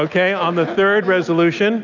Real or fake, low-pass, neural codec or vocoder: real; 7.2 kHz; none